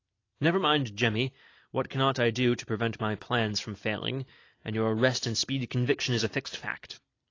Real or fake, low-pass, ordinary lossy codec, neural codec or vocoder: real; 7.2 kHz; AAC, 32 kbps; none